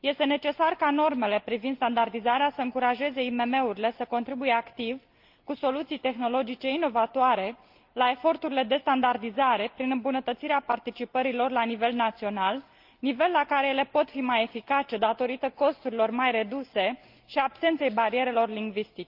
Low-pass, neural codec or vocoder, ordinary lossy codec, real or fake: 5.4 kHz; none; Opus, 32 kbps; real